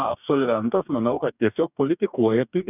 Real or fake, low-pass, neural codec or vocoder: fake; 3.6 kHz; codec, 44.1 kHz, 2.6 kbps, DAC